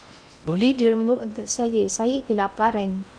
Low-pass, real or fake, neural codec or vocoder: 9.9 kHz; fake; codec, 16 kHz in and 24 kHz out, 0.6 kbps, FocalCodec, streaming, 4096 codes